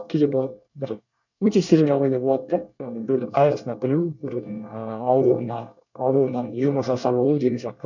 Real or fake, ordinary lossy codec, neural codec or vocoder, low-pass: fake; none; codec, 24 kHz, 1 kbps, SNAC; 7.2 kHz